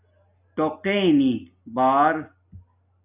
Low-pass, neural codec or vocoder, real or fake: 3.6 kHz; none; real